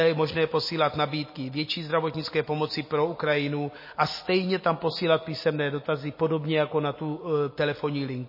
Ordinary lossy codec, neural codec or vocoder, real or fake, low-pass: MP3, 24 kbps; none; real; 5.4 kHz